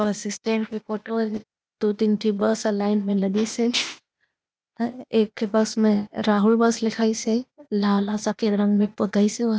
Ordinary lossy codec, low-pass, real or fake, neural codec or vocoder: none; none; fake; codec, 16 kHz, 0.8 kbps, ZipCodec